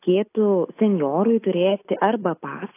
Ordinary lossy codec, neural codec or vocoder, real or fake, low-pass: AAC, 24 kbps; none; real; 3.6 kHz